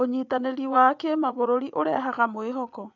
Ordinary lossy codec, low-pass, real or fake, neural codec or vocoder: none; 7.2 kHz; fake; vocoder, 24 kHz, 100 mel bands, Vocos